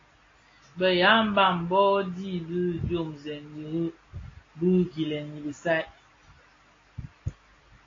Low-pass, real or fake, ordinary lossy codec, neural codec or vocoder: 7.2 kHz; real; AAC, 32 kbps; none